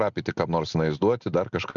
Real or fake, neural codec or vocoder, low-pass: real; none; 7.2 kHz